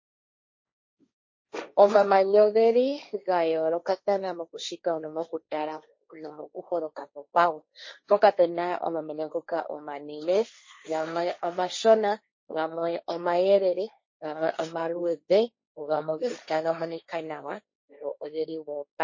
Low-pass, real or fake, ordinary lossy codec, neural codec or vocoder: 7.2 kHz; fake; MP3, 32 kbps; codec, 16 kHz, 1.1 kbps, Voila-Tokenizer